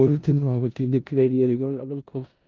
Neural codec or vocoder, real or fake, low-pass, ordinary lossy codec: codec, 16 kHz in and 24 kHz out, 0.4 kbps, LongCat-Audio-Codec, four codebook decoder; fake; 7.2 kHz; Opus, 24 kbps